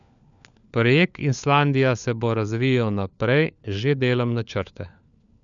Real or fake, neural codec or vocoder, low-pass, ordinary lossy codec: fake; codec, 16 kHz, 4 kbps, FunCodec, trained on LibriTTS, 50 frames a second; 7.2 kHz; none